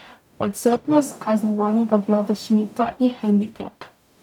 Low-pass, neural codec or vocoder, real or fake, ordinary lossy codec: 19.8 kHz; codec, 44.1 kHz, 0.9 kbps, DAC; fake; none